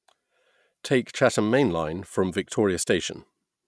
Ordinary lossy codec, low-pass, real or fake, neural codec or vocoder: none; none; real; none